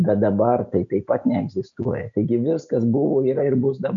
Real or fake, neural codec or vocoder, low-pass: real; none; 7.2 kHz